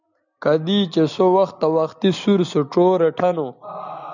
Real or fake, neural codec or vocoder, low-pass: real; none; 7.2 kHz